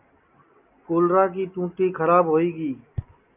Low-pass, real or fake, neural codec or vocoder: 3.6 kHz; real; none